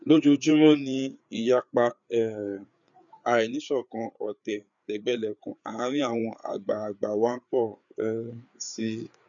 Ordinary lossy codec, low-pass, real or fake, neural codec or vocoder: none; 7.2 kHz; fake; codec, 16 kHz, 4 kbps, FreqCodec, larger model